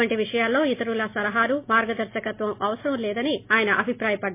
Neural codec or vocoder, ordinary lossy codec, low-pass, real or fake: none; MP3, 24 kbps; 3.6 kHz; real